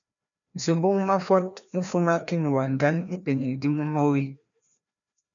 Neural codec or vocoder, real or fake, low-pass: codec, 16 kHz, 1 kbps, FreqCodec, larger model; fake; 7.2 kHz